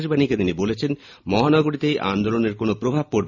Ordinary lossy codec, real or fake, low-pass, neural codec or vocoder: none; real; 7.2 kHz; none